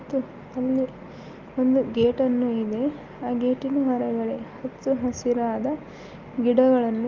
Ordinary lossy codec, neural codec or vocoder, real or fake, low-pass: Opus, 24 kbps; none; real; 7.2 kHz